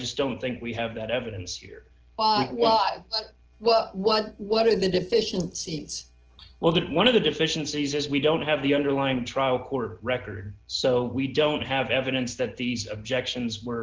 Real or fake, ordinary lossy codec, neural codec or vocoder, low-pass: real; Opus, 16 kbps; none; 7.2 kHz